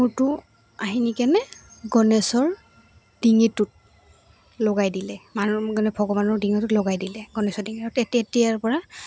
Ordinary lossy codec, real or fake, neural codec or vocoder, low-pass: none; real; none; none